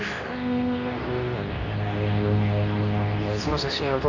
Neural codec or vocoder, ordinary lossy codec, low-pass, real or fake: codec, 24 kHz, 0.9 kbps, WavTokenizer, medium speech release version 2; none; 7.2 kHz; fake